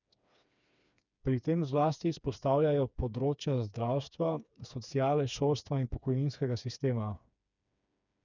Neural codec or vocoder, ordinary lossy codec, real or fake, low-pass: codec, 16 kHz, 4 kbps, FreqCodec, smaller model; none; fake; 7.2 kHz